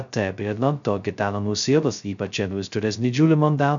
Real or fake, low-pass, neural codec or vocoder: fake; 7.2 kHz; codec, 16 kHz, 0.2 kbps, FocalCodec